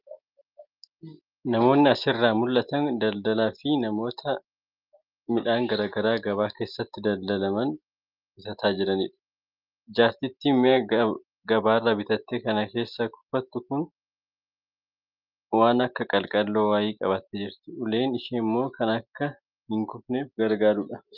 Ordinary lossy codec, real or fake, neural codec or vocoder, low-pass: Opus, 24 kbps; real; none; 5.4 kHz